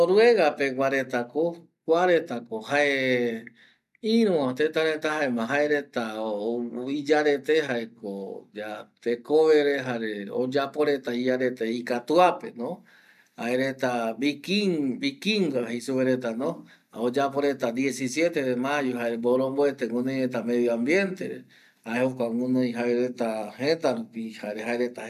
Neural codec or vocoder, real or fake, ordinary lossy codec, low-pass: none; real; none; 14.4 kHz